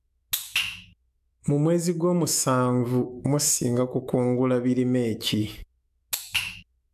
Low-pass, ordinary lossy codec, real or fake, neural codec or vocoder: 14.4 kHz; AAC, 96 kbps; fake; autoencoder, 48 kHz, 128 numbers a frame, DAC-VAE, trained on Japanese speech